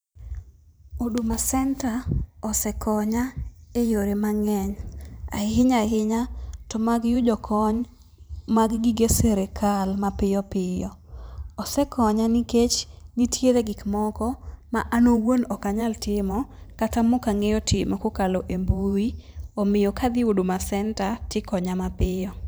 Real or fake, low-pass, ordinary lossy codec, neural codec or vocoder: fake; none; none; vocoder, 44.1 kHz, 128 mel bands every 512 samples, BigVGAN v2